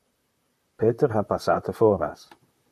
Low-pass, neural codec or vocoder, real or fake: 14.4 kHz; vocoder, 44.1 kHz, 128 mel bands, Pupu-Vocoder; fake